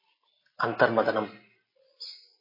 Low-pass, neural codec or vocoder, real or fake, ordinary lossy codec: 5.4 kHz; none; real; MP3, 24 kbps